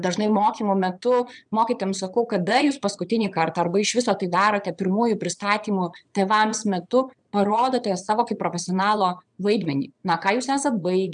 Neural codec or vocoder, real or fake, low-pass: vocoder, 22.05 kHz, 80 mel bands, Vocos; fake; 9.9 kHz